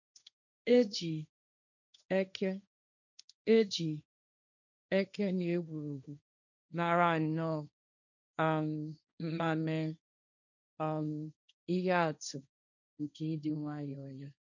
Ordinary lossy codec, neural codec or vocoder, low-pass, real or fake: none; codec, 16 kHz, 1.1 kbps, Voila-Tokenizer; 7.2 kHz; fake